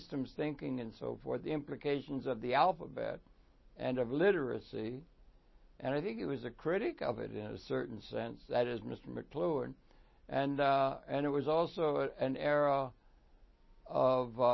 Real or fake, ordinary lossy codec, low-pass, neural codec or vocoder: real; MP3, 24 kbps; 7.2 kHz; none